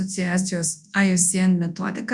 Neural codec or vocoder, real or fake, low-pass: codec, 24 kHz, 0.9 kbps, WavTokenizer, large speech release; fake; 10.8 kHz